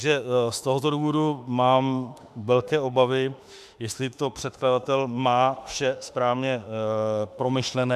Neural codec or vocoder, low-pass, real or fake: autoencoder, 48 kHz, 32 numbers a frame, DAC-VAE, trained on Japanese speech; 14.4 kHz; fake